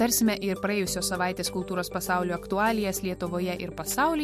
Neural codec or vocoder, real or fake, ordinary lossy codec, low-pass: none; real; MP3, 64 kbps; 14.4 kHz